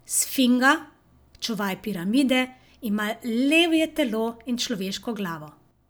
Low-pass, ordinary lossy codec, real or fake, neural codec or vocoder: none; none; real; none